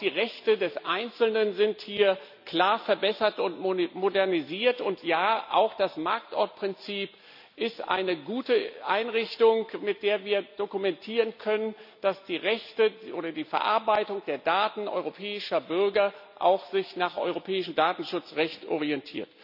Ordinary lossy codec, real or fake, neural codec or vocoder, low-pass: none; real; none; 5.4 kHz